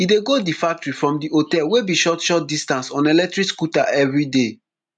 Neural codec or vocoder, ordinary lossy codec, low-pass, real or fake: none; none; 9.9 kHz; real